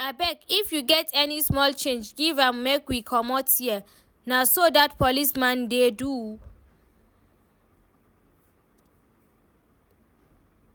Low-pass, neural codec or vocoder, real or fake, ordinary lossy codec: none; none; real; none